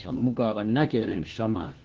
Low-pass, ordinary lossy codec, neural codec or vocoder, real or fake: 7.2 kHz; Opus, 16 kbps; codec, 16 kHz, 1 kbps, FunCodec, trained on LibriTTS, 50 frames a second; fake